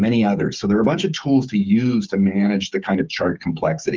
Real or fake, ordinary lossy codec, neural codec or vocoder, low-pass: fake; Opus, 32 kbps; codec, 16 kHz, 8 kbps, FreqCodec, smaller model; 7.2 kHz